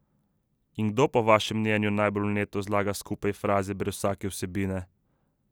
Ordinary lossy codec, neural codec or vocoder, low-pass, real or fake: none; none; none; real